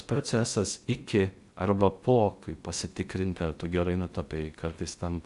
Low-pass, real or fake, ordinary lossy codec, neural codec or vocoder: 10.8 kHz; fake; Opus, 64 kbps; codec, 16 kHz in and 24 kHz out, 0.6 kbps, FocalCodec, streaming, 2048 codes